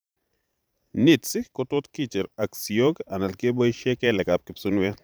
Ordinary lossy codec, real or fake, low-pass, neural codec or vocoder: none; fake; none; vocoder, 44.1 kHz, 128 mel bands every 512 samples, BigVGAN v2